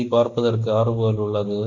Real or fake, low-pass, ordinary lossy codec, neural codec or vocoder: real; 7.2 kHz; none; none